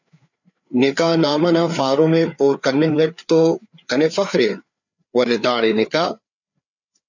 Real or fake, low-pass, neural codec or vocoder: fake; 7.2 kHz; codec, 16 kHz, 4 kbps, FreqCodec, larger model